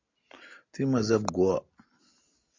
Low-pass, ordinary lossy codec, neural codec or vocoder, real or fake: 7.2 kHz; MP3, 64 kbps; none; real